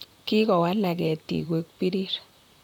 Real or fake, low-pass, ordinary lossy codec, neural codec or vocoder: fake; 19.8 kHz; none; vocoder, 44.1 kHz, 128 mel bands, Pupu-Vocoder